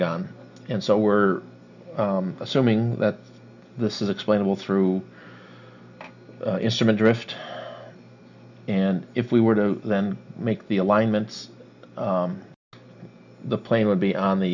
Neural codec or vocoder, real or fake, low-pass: none; real; 7.2 kHz